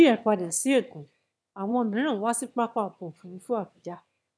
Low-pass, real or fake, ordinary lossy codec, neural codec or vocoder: none; fake; none; autoencoder, 22.05 kHz, a latent of 192 numbers a frame, VITS, trained on one speaker